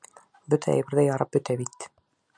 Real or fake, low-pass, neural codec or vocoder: real; 9.9 kHz; none